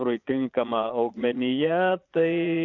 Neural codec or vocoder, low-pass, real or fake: vocoder, 22.05 kHz, 80 mel bands, Vocos; 7.2 kHz; fake